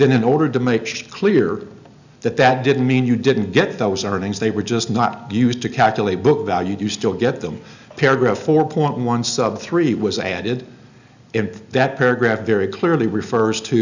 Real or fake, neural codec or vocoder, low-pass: real; none; 7.2 kHz